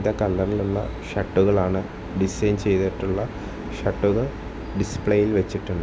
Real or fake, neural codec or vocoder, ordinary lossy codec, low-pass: real; none; none; none